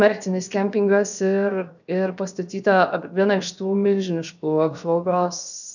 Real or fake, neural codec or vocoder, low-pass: fake; codec, 16 kHz, 0.7 kbps, FocalCodec; 7.2 kHz